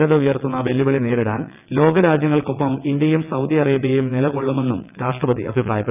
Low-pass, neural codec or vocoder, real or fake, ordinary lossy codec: 3.6 kHz; vocoder, 22.05 kHz, 80 mel bands, WaveNeXt; fake; none